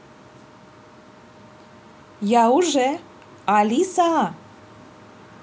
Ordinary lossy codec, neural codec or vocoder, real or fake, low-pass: none; none; real; none